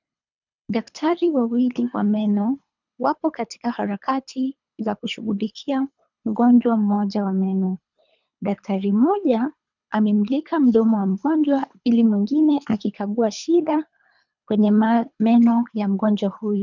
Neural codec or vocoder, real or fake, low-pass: codec, 24 kHz, 3 kbps, HILCodec; fake; 7.2 kHz